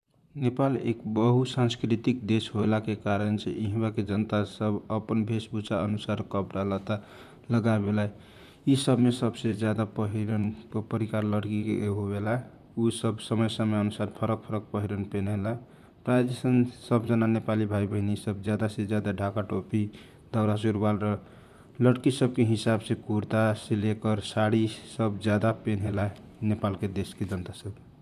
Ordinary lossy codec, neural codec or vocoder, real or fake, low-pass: none; vocoder, 44.1 kHz, 128 mel bands, Pupu-Vocoder; fake; 14.4 kHz